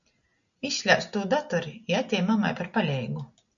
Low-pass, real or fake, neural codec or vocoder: 7.2 kHz; real; none